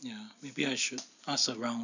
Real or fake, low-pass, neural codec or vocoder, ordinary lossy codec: real; 7.2 kHz; none; none